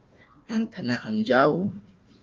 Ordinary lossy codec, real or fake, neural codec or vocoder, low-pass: Opus, 24 kbps; fake; codec, 16 kHz, 1 kbps, FunCodec, trained on Chinese and English, 50 frames a second; 7.2 kHz